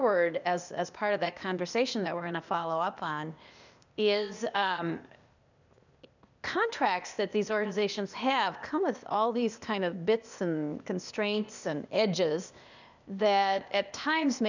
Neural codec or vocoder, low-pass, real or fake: codec, 16 kHz, 0.8 kbps, ZipCodec; 7.2 kHz; fake